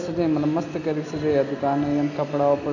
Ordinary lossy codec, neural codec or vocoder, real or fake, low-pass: none; none; real; 7.2 kHz